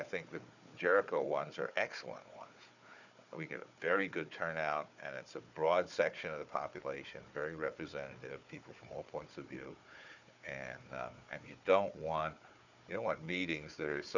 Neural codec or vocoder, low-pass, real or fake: codec, 16 kHz, 4 kbps, FunCodec, trained on Chinese and English, 50 frames a second; 7.2 kHz; fake